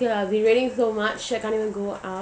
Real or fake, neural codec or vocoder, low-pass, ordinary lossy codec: real; none; none; none